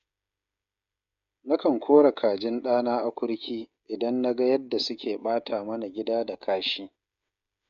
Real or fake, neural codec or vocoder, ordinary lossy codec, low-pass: fake; codec, 16 kHz, 16 kbps, FreqCodec, smaller model; none; 7.2 kHz